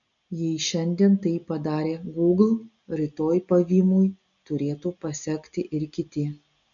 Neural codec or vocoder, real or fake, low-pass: none; real; 7.2 kHz